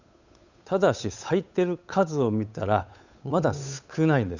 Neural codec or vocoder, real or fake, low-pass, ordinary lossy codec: codec, 16 kHz, 8 kbps, FunCodec, trained on Chinese and English, 25 frames a second; fake; 7.2 kHz; none